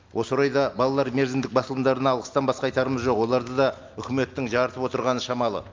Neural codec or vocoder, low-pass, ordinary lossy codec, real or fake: none; 7.2 kHz; Opus, 24 kbps; real